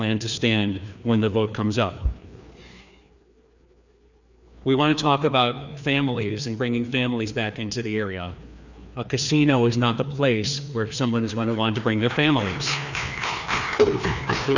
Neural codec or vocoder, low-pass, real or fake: codec, 16 kHz, 2 kbps, FreqCodec, larger model; 7.2 kHz; fake